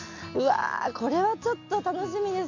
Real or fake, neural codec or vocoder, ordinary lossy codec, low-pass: real; none; MP3, 64 kbps; 7.2 kHz